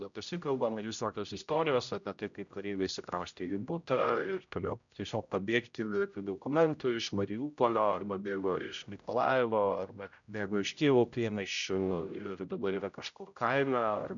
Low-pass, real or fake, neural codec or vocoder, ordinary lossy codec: 7.2 kHz; fake; codec, 16 kHz, 0.5 kbps, X-Codec, HuBERT features, trained on general audio; MP3, 96 kbps